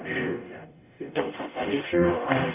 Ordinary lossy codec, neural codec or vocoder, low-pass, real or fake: none; codec, 44.1 kHz, 0.9 kbps, DAC; 3.6 kHz; fake